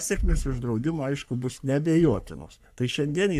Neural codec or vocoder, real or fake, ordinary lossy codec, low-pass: codec, 44.1 kHz, 3.4 kbps, Pupu-Codec; fake; AAC, 96 kbps; 14.4 kHz